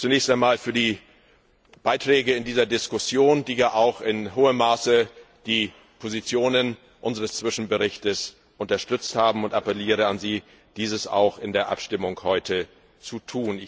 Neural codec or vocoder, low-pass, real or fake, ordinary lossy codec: none; none; real; none